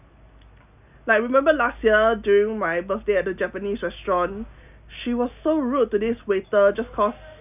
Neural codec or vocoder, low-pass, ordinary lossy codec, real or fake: none; 3.6 kHz; none; real